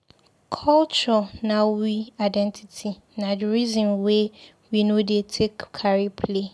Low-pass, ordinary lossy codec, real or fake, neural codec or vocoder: none; none; real; none